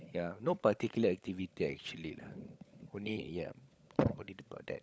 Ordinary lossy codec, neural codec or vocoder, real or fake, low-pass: none; codec, 16 kHz, 16 kbps, FunCodec, trained on LibriTTS, 50 frames a second; fake; none